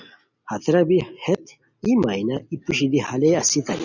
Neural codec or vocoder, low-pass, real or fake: none; 7.2 kHz; real